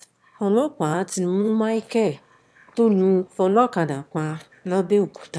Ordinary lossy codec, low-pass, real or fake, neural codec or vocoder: none; none; fake; autoencoder, 22.05 kHz, a latent of 192 numbers a frame, VITS, trained on one speaker